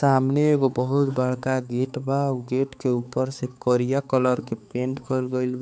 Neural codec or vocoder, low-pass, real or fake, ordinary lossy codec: codec, 16 kHz, 4 kbps, X-Codec, HuBERT features, trained on balanced general audio; none; fake; none